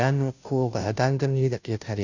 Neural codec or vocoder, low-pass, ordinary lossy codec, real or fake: codec, 16 kHz, 0.5 kbps, FunCodec, trained on Chinese and English, 25 frames a second; 7.2 kHz; none; fake